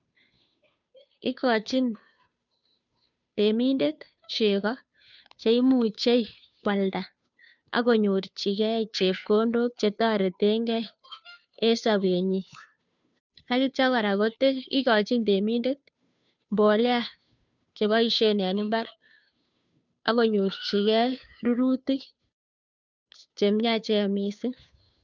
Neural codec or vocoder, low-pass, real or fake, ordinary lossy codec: codec, 16 kHz, 2 kbps, FunCodec, trained on Chinese and English, 25 frames a second; 7.2 kHz; fake; none